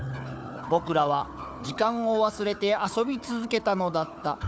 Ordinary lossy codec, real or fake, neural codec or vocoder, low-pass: none; fake; codec, 16 kHz, 4 kbps, FunCodec, trained on Chinese and English, 50 frames a second; none